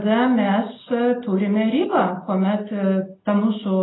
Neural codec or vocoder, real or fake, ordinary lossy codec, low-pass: none; real; AAC, 16 kbps; 7.2 kHz